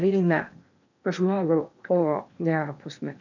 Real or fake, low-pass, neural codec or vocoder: fake; 7.2 kHz; codec, 16 kHz in and 24 kHz out, 0.8 kbps, FocalCodec, streaming, 65536 codes